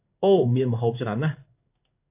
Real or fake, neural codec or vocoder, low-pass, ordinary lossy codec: fake; codec, 16 kHz in and 24 kHz out, 1 kbps, XY-Tokenizer; 3.6 kHz; AAC, 32 kbps